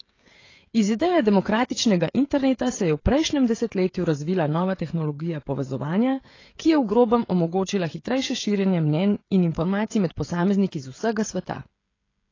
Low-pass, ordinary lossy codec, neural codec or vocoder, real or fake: 7.2 kHz; AAC, 32 kbps; codec, 16 kHz, 16 kbps, FreqCodec, smaller model; fake